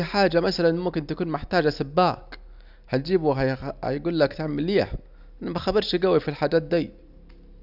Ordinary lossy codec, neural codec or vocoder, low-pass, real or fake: none; none; 5.4 kHz; real